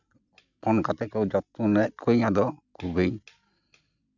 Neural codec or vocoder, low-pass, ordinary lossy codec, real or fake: none; 7.2 kHz; none; real